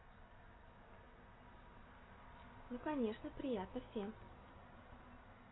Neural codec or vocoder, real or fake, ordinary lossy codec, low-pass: none; real; AAC, 16 kbps; 7.2 kHz